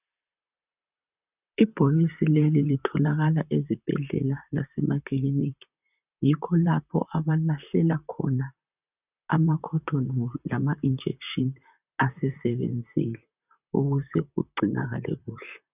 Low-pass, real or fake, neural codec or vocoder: 3.6 kHz; fake; vocoder, 44.1 kHz, 128 mel bands, Pupu-Vocoder